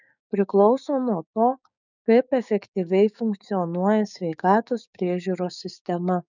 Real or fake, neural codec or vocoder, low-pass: fake; codec, 16 kHz, 6 kbps, DAC; 7.2 kHz